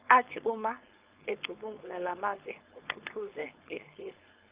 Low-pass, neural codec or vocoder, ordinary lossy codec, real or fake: 3.6 kHz; codec, 16 kHz, 4.8 kbps, FACodec; Opus, 24 kbps; fake